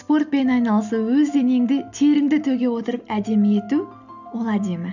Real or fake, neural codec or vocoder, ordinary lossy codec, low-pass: real; none; none; 7.2 kHz